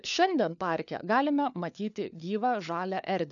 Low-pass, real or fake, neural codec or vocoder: 7.2 kHz; fake; codec, 16 kHz, 4 kbps, FunCodec, trained on LibriTTS, 50 frames a second